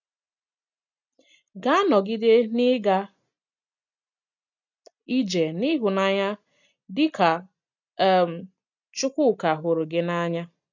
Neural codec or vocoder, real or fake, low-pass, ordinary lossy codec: none; real; 7.2 kHz; none